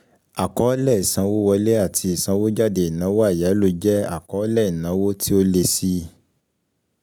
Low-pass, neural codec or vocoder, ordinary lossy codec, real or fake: none; none; none; real